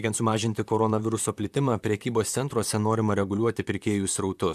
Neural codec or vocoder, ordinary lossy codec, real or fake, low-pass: vocoder, 44.1 kHz, 128 mel bands, Pupu-Vocoder; AAC, 96 kbps; fake; 14.4 kHz